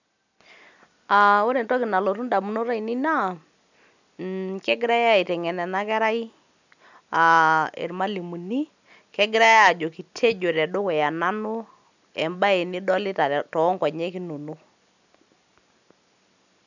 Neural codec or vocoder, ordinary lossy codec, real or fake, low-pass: none; none; real; 7.2 kHz